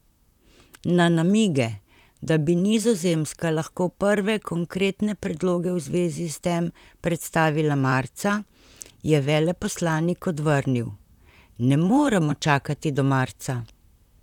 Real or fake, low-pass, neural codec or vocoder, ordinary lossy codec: fake; 19.8 kHz; vocoder, 44.1 kHz, 128 mel bands, Pupu-Vocoder; none